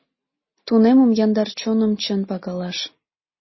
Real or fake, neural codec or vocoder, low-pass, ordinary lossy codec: real; none; 7.2 kHz; MP3, 24 kbps